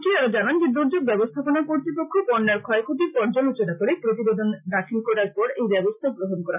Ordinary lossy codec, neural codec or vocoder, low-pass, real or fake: none; none; 3.6 kHz; real